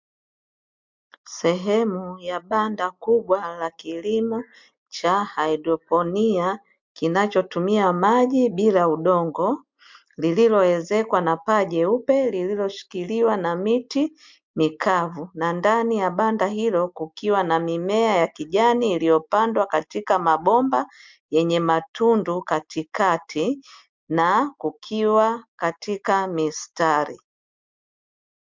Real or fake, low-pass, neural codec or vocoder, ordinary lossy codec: real; 7.2 kHz; none; MP3, 64 kbps